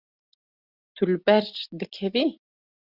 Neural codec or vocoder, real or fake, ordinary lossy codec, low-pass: none; real; Opus, 64 kbps; 5.4 kHz